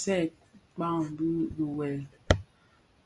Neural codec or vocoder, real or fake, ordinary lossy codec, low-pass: none; real; Opus, 64 kbps; 10.8 kHz